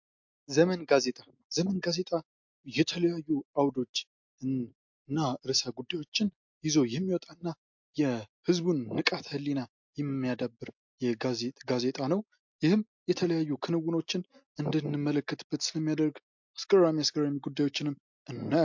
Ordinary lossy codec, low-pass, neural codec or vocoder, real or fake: MP3, 48 kbps; 7.2 kHz; none; real